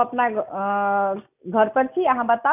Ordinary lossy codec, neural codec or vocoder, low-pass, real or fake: none; none; 3.6 kHz; real